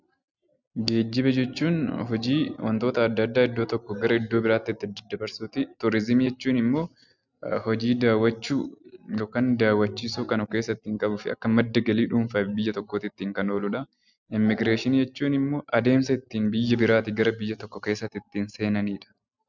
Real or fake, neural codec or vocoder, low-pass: real; none; 7.2 kHz